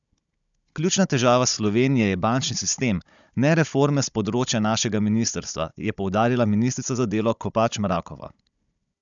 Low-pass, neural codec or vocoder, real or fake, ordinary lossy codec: 7.2 kHz; codec, 16 kHz, 16 kbps, FunCodec, trained on Chinese and English, 50 frames a second; fake; none